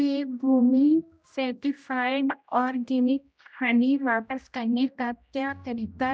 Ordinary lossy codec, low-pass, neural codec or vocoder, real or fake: none; none; codec, 16 kHz, 0.5 kbps, X-Codec, HuBERT features, trained on general audio; fake